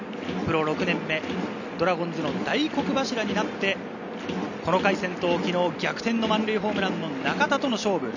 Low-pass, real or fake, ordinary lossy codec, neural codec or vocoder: 7.2 kHz; real; none; none